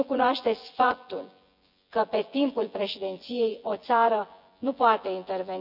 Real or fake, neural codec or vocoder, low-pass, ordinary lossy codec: fake; vocoder, 24 kHz, 100 mel bands, Vocos; 5.4 kHz; none